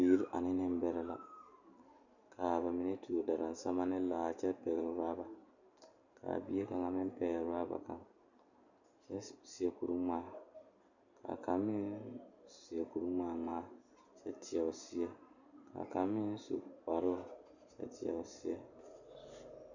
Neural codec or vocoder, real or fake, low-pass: none; real; 7.2 kHz